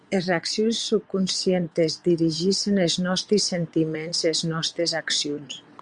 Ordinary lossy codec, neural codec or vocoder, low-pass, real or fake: Opus, 64 kbps; vocoder, 22.05 kHz, 80 mel bands, WaveNeXt; 9.9 kHz; fake